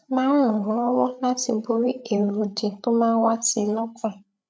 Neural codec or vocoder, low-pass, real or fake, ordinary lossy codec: codec, 16 kHz, 8 kbps, FreqCodec, larger model; none; fake; none